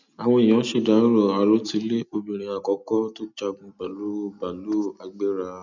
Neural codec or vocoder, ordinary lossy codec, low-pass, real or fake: none; none; 7.2 kHz; real